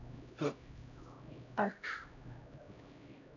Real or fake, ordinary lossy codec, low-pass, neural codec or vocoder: fake; none; 7.2 kHz; codec, 16 kHz, 0.5 kbps, X-Codec, HuBERT features, trained on LibriSpeech